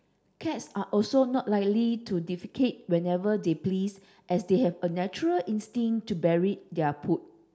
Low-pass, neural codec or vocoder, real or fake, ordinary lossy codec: none; none; real; none